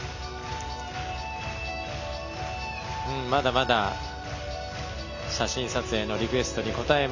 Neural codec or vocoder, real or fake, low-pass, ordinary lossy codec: none; real; 7.2 kHz; none